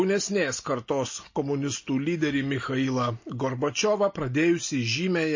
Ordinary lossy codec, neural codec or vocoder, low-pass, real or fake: MP3, 32 kbps; none; 7.2 kHz; real